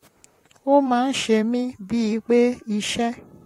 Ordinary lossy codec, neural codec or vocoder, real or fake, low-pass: AAC, 48 kbps; vocoder, 44.1 kHz, 128 mel bands, Pupu-Vocoder; fake; 19.8 kHz